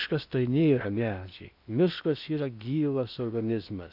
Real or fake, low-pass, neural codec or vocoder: fake; 5.4 kHz; codec, 16 kHz in and 24 kHz out, 0.8 kbps, FocalCodec, streaming, 65536 codes